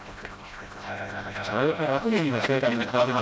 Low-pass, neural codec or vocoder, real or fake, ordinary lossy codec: none; codec, 16 kHz, 0.5 kbps, FreqCodec, smaller model; fake; none